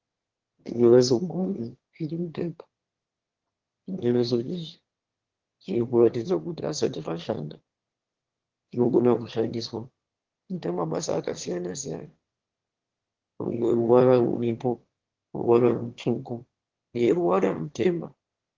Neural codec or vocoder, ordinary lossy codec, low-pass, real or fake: autoencoder, 22.05 kHz, a latent of 192 numbers a frame, VITS, trained on one speaker; Opus, 16 kbps; 7.2 kHz; fake